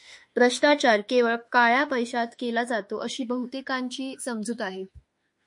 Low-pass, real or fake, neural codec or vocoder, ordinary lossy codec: 10.8 kHz; fake; autoencoder, 48 kHz, 32 numbers a frame, DAC-VAE, trained on Japanese speech; MP3, 48 kbps